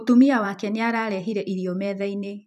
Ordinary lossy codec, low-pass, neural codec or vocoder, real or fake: none; 14.4 kHz; vocoder, 44.1 kHz, 128 mel bands every 512 samples, BigVGAN v2; fake